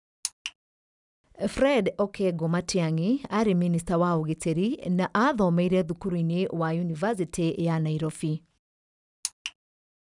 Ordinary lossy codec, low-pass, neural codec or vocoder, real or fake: none; 10.8 kHz; none; real